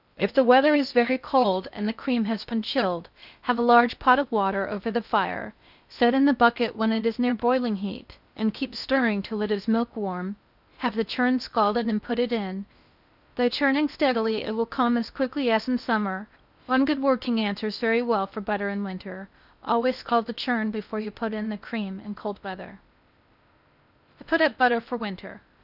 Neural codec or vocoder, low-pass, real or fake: codec, 16 kHz in and 24 kHz out, 0.6 kbps, FocalCodec, streaming, 2048 codes; 5.4 kHz; fake